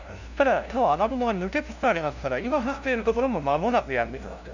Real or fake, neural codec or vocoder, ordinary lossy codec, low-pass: fake; codec, 16 kHz, 0.5 kbps, FunCodec, trained on LibriTTS, 25 frames a second; none; 7.2 kHz